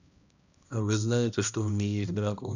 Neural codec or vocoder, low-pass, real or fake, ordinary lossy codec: codec, 16 kHz, 1 kbps, X-Codec, HuBERT features, trained on balanced general audio; 7.2 kHz; fake; none